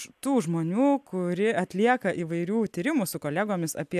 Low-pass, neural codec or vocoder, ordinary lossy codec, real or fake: 14.4 kHz; none; AAC, 96 kbps; real